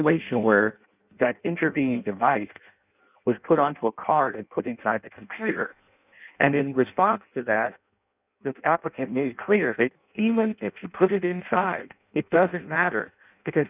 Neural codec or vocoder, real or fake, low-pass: codec, 16 kHz in and 24 kHz out, 0.6 kbps, FireRedTTS-2 codec; fake; 3.6 kHz